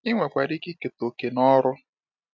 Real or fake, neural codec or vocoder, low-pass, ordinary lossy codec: real; none; 7.2 kHz; none